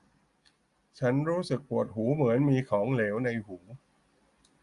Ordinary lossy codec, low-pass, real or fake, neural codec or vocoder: Opus, 64 kbps; 10.8 kHz; real; none